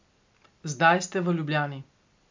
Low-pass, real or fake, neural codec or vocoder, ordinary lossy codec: 7.2 kHz; real; none; MP3, 64 kbps